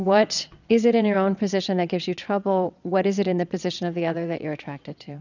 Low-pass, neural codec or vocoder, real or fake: 7.2 kHz; vocoder, 22.05 kHz, 80 mel bands, WaveNeXt; fake